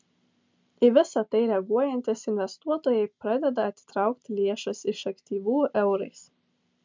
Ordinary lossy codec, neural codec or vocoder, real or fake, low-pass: MP3, 64 kbps; none; real; 7.2 kHz